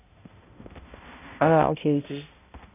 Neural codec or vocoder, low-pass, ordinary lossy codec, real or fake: codec, 16 kHz, 0.5 kbps, X-Codec, HuBERT features, trained on balanced general audio; 3.6 kHz; none; fake